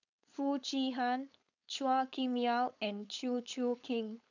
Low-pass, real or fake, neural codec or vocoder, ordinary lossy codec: 7.2 kHz; fake; codec, 16 kHz, 4.8 kbps, FACodec; none